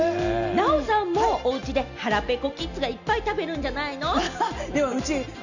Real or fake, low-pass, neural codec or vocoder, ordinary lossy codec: real; 7.2 kHz; none; none